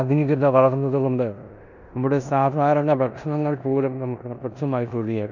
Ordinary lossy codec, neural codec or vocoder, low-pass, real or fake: none; codec, 16 kHz in and 24 kHz out, 0.9 kbps, LongCat-Audio-Codec, four codebook decoder; 7.2 kHz; fake